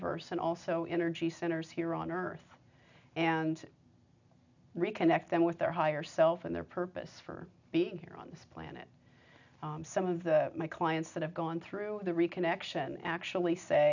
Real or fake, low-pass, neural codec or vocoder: real; 7.2 kHz; none